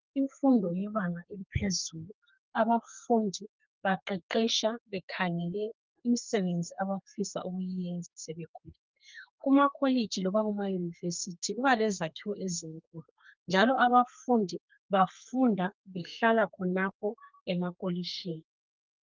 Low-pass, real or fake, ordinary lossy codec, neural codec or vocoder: 7.2 kHz; fake; Opus, 24 kbps; codec, 32 kHz, 1.9 kbps, SNAC